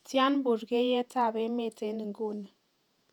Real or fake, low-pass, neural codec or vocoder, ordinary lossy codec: fake; 19.8 kHz; vocoder, 48 kHz, 128 mel bands, Vocos; none